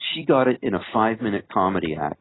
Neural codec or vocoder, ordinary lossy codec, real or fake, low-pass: none; AAC, 16 kbps; real; 7.2 kHz